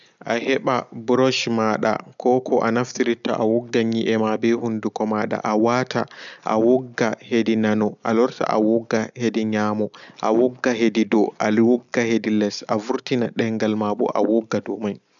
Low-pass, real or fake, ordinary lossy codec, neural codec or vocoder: 7.2 kHz; real; none; none